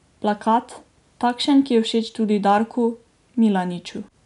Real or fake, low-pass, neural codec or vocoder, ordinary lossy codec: real; 10.8 kHz; none; none